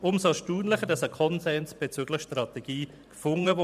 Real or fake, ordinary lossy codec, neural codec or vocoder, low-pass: fake; none; vocoder, 44.1 kHz, 128 mel bands every 512 samples, BigVGAN v2; 14.4 kHz